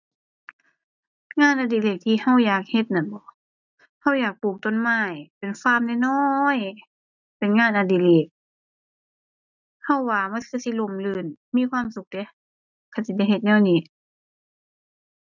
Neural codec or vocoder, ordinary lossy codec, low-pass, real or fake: none; none; 7.2 kHz; real